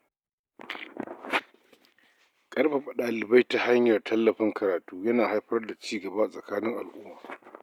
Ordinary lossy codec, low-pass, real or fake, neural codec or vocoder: none; 19.8 kHz; real; none